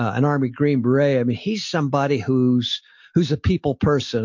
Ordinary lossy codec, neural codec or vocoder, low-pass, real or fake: MP3, 48 kbps; none; 7.2 kHz; real